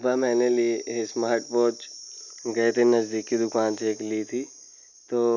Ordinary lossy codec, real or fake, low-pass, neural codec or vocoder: none; real; 7.2 kHz; none